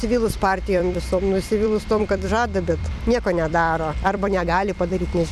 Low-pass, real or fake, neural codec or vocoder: 14.4 kHz; fake; vocoder, 44.1 kHz, 128 mel bands every 256 samples, BigVGAN v2